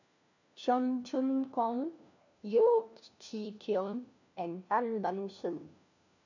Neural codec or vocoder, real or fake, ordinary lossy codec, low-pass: codec, 16 kHz, 1 kbps, FunCodec, trained on LibriTTS, 50 frames a second; fake; none; 7.2 kHz